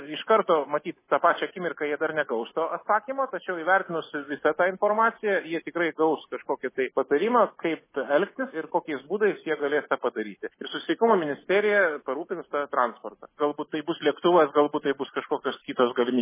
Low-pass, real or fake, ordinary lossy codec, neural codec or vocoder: 3.6 kHz; real; MP3, 16 kbps; none